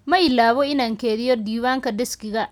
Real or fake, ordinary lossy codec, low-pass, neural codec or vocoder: real; none; 19.8 kHz; none